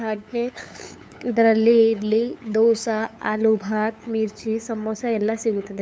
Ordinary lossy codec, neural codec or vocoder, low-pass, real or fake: none; codec, 16 kHz, 8 kbps, FunCodec, trained on LibriTTS, 25 frames a second; none; fake